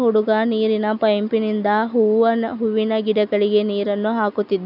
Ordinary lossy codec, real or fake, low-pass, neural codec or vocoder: none; real; 5.4 kHz; none